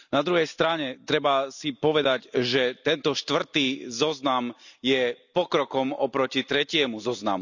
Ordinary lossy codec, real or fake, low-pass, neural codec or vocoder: none; real; 7.2 kHz; none